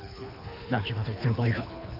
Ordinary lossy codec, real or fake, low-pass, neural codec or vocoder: none; fake; 5.4 kHz; codec, 24 kHz, 3 kbps, HILCodec